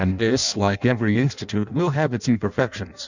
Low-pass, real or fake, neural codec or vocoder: 7.2 kHz; fake; codec, 16 kHz in and 24 kHz out, 0.6 kbps, FireRedTTS-2 codec